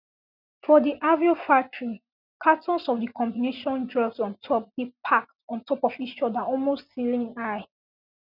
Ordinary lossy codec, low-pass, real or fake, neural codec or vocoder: none; 5.4 kHz; real; none